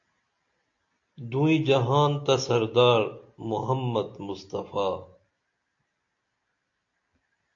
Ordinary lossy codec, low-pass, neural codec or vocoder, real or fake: AAC, 48 kbps; 7.2 kHz; none; real